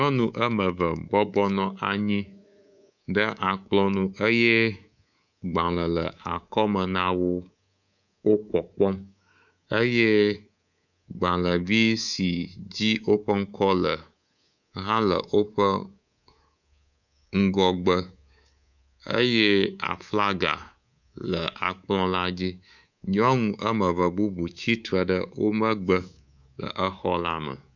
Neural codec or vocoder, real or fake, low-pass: codec, 24 kHz, 3.1 kbps, DualCodec; fake; 7.2 kHz